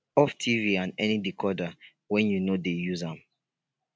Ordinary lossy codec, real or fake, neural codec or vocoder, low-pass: none; real; none; none